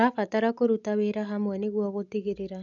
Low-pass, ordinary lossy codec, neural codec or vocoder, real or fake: 7.2 kHz; none; none; real